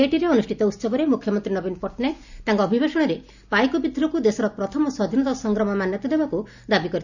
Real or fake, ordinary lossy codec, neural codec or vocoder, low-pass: real; none; none; 7.2 kHz